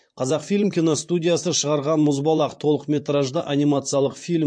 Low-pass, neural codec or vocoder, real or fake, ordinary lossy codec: 9.9 kHz; vocoder, 44.1 kHz, 128 mel bands, Pupu-Vocoder; fake; MP3, 48 kbps